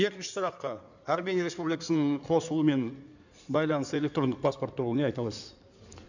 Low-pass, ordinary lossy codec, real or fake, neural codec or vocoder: 7.2 kHz; none; fake; codec, 16 kHz in and 24 kHz out, 2.2 kbps, FireRedTTS-2 codec